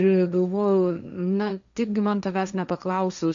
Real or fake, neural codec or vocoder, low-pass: fake; codec, 16 kHz, 1.1 kbps, Voila-Tokenizer; 7.2 kHz